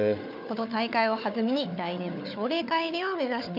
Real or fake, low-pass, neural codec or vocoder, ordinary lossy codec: fake; 5.4 kHz; codec, 16 kHz, 4 kbps, FunCodec, trained on Chinese and English, 50 frames a second; none